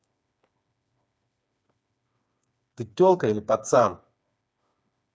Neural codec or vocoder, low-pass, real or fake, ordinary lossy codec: codec, 16 kHz, 4 kbps, FreqCodec, smaller model; none; fake; none